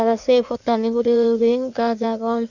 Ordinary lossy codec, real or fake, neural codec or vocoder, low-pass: none; fake; codec, 16 kHz in and 24 kHz out, 1.1 kbps, FireRedTTS-2 codec; 7.2 kHz